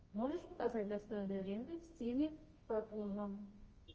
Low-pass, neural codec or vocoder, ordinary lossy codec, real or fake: 7.2 kHz; codec, 24 kHz, 0.9 kbps, WavTokenizer, medium music audio release; Opus, 24 kbps; fake